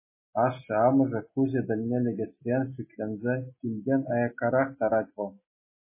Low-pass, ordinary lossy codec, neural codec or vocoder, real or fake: 3.6 kHz; MP3, 16 kbps; none; real